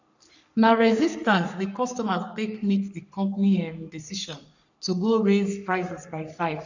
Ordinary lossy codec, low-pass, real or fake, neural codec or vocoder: none; 7.2 kHz; fake; codec, 44.1 kHz, 3.4 kbps, Pupu-Codec